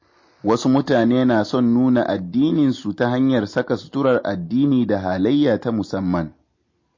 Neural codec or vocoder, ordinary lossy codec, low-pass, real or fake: none; MP3, 32 kbps; 7.2 kHz; real